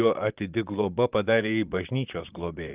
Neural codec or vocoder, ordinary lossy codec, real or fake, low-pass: vocoder, 44.1 kHz, 128 mel bands, Pupu-Vocoder; Opus, 32 kbps; fake; 3.6 kHz